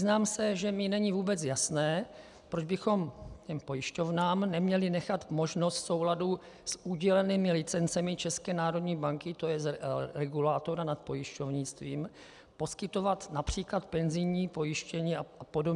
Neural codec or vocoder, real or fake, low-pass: none; real; 10.8 kHz